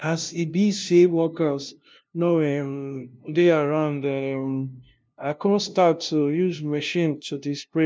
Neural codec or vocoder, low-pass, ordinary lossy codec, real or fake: codec, 16 kHz, 0.5 kbps, FunCodec, trained on LibriTTS, 25 frames a second; none; none; fake